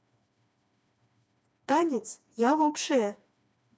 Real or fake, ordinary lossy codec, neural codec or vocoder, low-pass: fake; none; codec, 16 kHz, 2 kbps, FreqCodec, smaller model; none